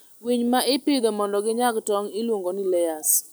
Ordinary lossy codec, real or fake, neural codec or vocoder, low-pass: none; real; none; none